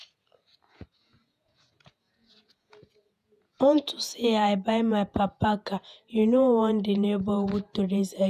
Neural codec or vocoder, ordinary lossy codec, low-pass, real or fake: vocoder, 48 kHz, 128 mel bands, Vocos; none; 14.4 kHz; fake